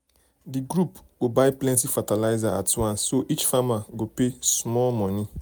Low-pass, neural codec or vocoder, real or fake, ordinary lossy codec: none; none; real; none